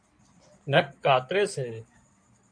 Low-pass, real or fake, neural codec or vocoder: 9.9 kHz; fake; codec, 16 kHz in and 24 kHz out, 2.2 kbps, FireRedTTS-2 codec